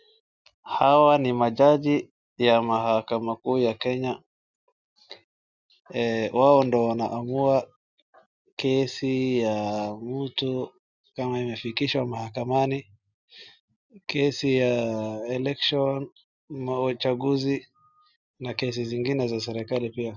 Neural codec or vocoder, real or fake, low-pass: none; real; 7.2 kHz